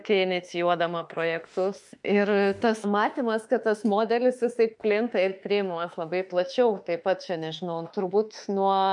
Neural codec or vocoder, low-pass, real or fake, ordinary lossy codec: autoencoder, 48 kHz, 32 numbers a frame, DAC-VAE, trained on Japanese speech; 10.8 kHz; fake; MP3, 64 kbps